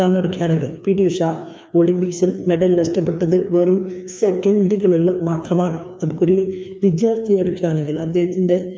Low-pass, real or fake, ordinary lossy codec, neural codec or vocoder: none; fake; none; codec, 16 kHz, 2 kbps, FreqCodec, larger model